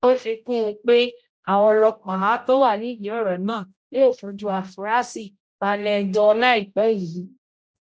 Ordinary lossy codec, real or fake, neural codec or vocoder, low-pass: none; fake; codec, 16 kHz, 0.5 kbps, X-Codec, HuBERT features, trained on general audio; none